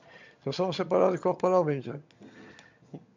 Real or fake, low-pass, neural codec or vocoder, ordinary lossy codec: fake; 7.2 kHz; vocoder, 22.05 kHz, 80 mel bands, HiFi-GAN; MP3, 64 kbps